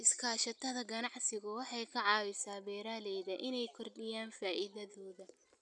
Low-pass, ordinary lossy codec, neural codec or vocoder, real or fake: 10.8 kHz; none; none; real